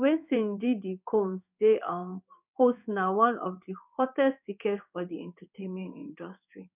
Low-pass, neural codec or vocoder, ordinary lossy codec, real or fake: 3.6 kHz; codec, 16 kHz in and 24 kHz out, 1 kbps, XY-Tokenizer; none; fake